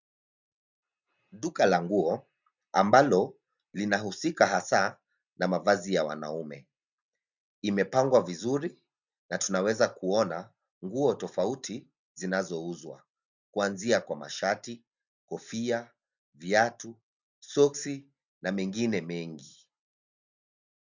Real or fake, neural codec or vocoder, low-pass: real; none; 7.2 kHz